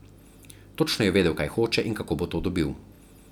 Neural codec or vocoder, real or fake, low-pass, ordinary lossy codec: none; real; 19.8 kHz; none